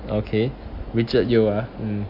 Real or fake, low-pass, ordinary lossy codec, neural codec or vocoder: real; 5.4 kHz; none; none